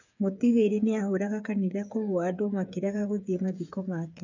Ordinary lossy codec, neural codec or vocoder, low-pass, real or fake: none; codec, 16 kHz, 8 kbps, FreqCodec, smaller model; 7.2 kHz; fake